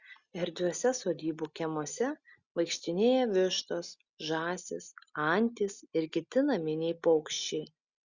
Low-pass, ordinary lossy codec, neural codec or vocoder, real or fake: 7.2 kHz; Opus, 64 kbps; none; real